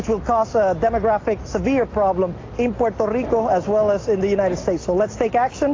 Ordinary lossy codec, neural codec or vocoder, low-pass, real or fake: AAC, 32 kbps; none; 7.2 kHz; real